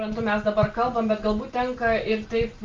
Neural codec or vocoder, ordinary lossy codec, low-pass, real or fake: none; Opus, 24 kbps; 7.2 kHz; real